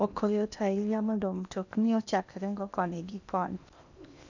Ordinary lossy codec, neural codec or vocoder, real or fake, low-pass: none; codec, 16 kHz in and 24 kHz out, 0.8 kbps, FocalCodec, streaming, 65536 codes; fake; 7.2 kHz